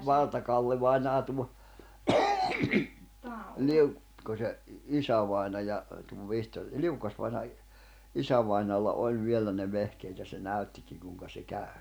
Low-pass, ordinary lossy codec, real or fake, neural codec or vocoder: none; none; real; none